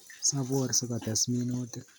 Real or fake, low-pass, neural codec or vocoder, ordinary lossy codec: real; none; none; none